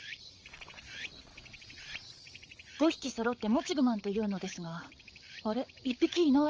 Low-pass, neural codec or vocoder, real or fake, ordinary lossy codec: 7.2 kHz; codec, 16 kHz, 8 kbps, FreqCodec, larger model; fake; Opus, 24 kbps